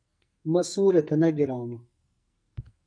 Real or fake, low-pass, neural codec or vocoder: fake; 9.9 kHz; codec, 44.1 kHz, 2.6 kbps, SNAC